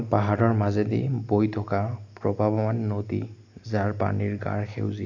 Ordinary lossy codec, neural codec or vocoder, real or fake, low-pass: AAC, 48 kbps; none; real; 7.2 kHz